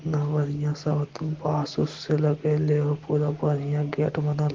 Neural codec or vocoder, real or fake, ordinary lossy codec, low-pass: none; real; Opus, 16 kbps; 7.2 kHz